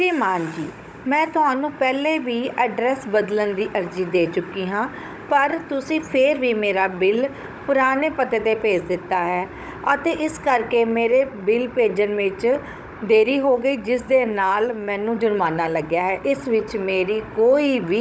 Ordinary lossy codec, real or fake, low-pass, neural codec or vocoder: none; fake; none; codec, 16 kHz, 16 kbps, FunCodec, trained on Chinese and English, 50 frames a second